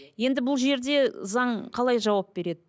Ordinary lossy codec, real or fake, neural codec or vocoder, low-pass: none; real; none; none